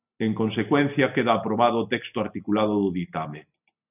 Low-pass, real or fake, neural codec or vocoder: 3.6 kHz; real; none